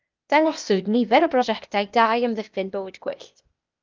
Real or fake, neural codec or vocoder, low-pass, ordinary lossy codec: fake; codec, 16 kHz, 0.8 kbps, ZipCodec; 7.2 kHz; Opus, 24 kbps